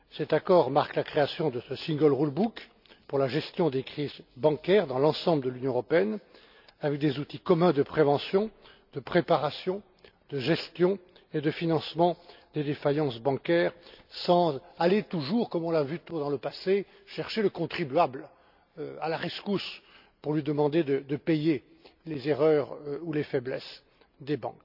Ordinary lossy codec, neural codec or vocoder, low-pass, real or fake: none; none; 5.4 kHz; real